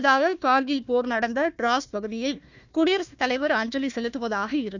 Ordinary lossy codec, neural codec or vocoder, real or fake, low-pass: none; codec, 16 kHz, 1 kbps, FunCodec, trained on Chinese and English, 50 frames a second; fake; 7.2 kHz